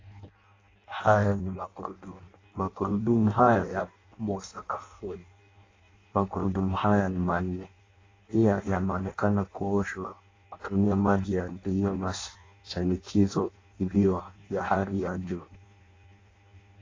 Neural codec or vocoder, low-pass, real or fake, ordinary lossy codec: codec, 16 kHz in and 24 kHz out, 0.6 kbps, FireRedTTS-2 codec; 7.2 kHz; fake; AAC, 32 kbps